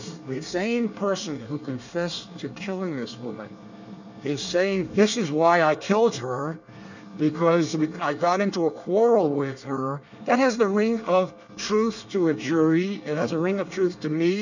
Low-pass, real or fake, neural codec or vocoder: 7.2 kHz; fake; codec, 24 kHz, 1 kbps, SNAC